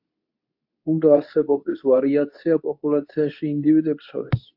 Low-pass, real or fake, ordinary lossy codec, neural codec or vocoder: 5.4 kHz; fake; AAC, 48 kbps; codec, 24 kHz, 0.9 kbps, WavTokenizer, medium speech release version 2